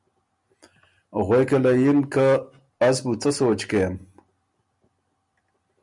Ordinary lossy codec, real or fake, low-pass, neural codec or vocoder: MP3, 96 kbps; real; 10.8 kHz; none